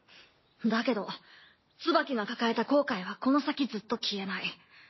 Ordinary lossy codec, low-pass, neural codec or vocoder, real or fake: MP3, 24 kbps; 7.2 kHz; none; real